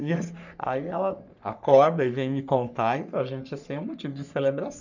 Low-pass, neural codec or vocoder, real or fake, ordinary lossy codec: 7.2 kHz; codec, 44.1 kHz, 3.4 kbps, Pupu-Codec; fake; none